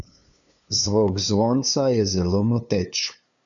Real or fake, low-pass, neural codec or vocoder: fake; 7.2 kHz; codec, 16 kHz, 2 kbps, FunCodec, trained on LibriTTS, 25 frames a second